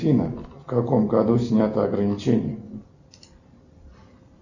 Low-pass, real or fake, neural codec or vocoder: 7.2 kHz; real; none